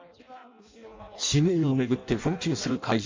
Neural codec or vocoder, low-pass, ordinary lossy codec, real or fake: codec, 16 kHz in and 24 kHz out, 0.6 kbps, FireRedTTS-2 codec; 7.2 kHz; MP3, 64 kbps; fake